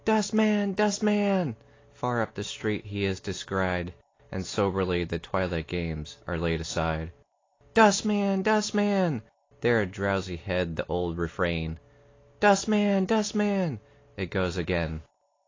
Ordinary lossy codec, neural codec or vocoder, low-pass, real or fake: AAC, 32 kbps; none; 7.2 kHz; real